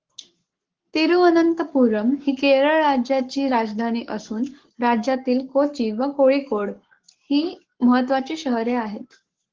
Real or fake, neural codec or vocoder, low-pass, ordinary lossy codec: fake; codec, 44.1 kHz, 7.8 kbps, Pupu-Codec; 7.2 kHz; Opus, 16 kbps